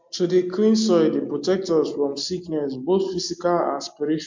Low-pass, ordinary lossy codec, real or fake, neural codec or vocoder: 7.2 kHz; MP3, 48 kbps; real; none